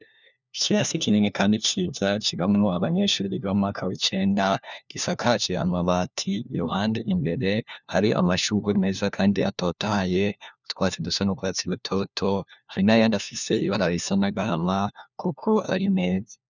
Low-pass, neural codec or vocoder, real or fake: 7.2 kHz; codec, 16 kHz, 1 kbps, FunCodec, trained on LibriTTS, 50 frames a second; fake